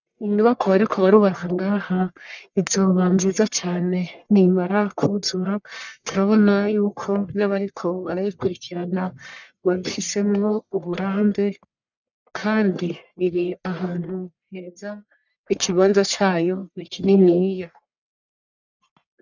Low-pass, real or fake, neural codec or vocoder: 7.2 kHz; fake; codec, 44.1 kHz, 1.7 kbps, Pupu-Codec